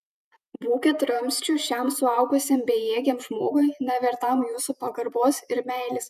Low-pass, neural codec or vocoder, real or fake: 14.4 kHz; vocoder, 48 kHz, 128 mel bands, Vocos; fake